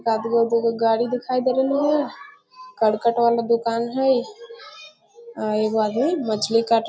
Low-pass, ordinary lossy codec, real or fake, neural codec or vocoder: none; none; real; none